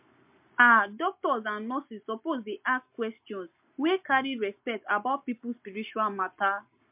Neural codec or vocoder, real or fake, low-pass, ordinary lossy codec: none; real; 3.6 kHz; MP3, 32 kbps